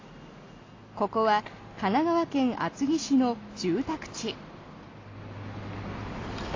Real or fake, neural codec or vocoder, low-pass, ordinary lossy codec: real; none; 7.2 kHz; AAC, 32 kbps